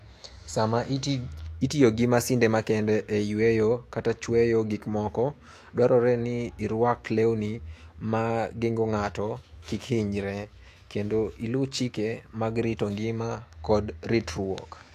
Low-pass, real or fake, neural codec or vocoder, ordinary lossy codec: 14.4 kHz; fake; autoencoder, 48 kHz, 128 numbers a frame, DAC-VAE, trained on Japanese speech; AAC, 64 kbps